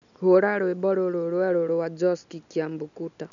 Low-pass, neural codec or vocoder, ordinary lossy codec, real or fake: 7.2 kHz; none; none; real